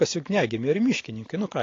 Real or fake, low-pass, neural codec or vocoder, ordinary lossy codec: real; 7.2 kHz; none; AAC, 32 kbps